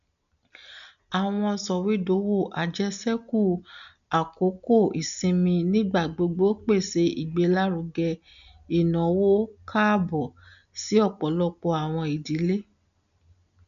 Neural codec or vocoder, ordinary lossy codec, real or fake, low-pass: none; none; real; 7.2 kHz